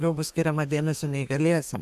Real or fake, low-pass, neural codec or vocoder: fake; 14.4 kHz; codec, 32 kHz, 1.9 kbps, SNAC